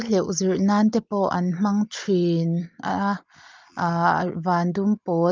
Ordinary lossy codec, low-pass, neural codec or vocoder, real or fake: Opus, 24 kbps; 7.2 kHz; none; real